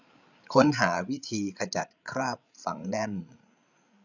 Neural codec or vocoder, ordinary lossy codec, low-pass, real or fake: codec, 16 kHz, 8 kbps, FreqCodec, larger model; none; 7.2 kHz; fake